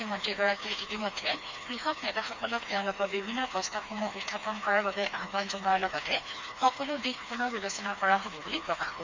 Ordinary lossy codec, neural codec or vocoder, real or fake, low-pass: MP3, 64 kbps; codec, 16 kHz, 2 kbps, FreqCodec, smaller model; fake; 7.2 kHz